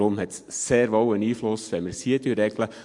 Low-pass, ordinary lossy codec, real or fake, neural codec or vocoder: 10.8 kHz; MP3, 64 kbps; real; none